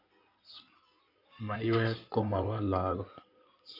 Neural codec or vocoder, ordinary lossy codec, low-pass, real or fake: codec, 16 kHz in and 24 kHz out, 2.2 kbps, FireRedTTS-2 codec; none; 5.4 kHz; fake